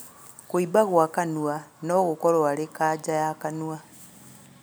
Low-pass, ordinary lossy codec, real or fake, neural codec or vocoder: none; none; real; none